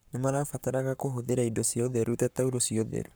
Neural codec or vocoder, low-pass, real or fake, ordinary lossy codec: codec, 44.1 kHz, 7.8 kbps, Pupu-Codec; none; fake; none